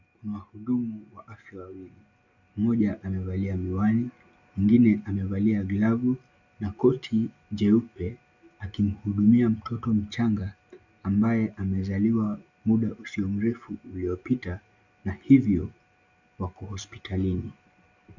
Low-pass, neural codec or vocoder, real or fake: 7.2 kHz; none; real